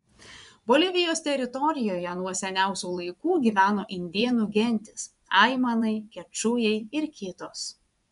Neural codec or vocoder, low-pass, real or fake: vocoder, 24 kHz, 100 mel bands, Vocos; 10.8 kHz; fake